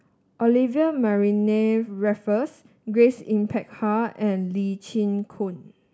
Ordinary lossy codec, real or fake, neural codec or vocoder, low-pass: none; real; none; none